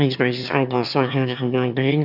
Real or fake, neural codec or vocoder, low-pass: fake; autoencoder, 22.05 kHz, a latent of 192 numbers a frame, VITS, trained on one speaker; 5.4 kHz